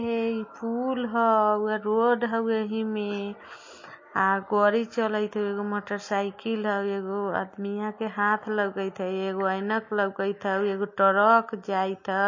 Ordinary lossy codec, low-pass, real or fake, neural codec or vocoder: MP3, 32 kbps; 7.2 kHz; real; none